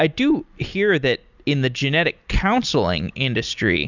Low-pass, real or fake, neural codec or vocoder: 7.2 kHz; real; none